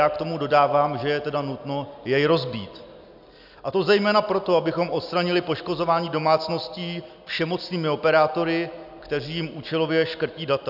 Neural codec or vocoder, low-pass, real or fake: none; 5.4 kHz; real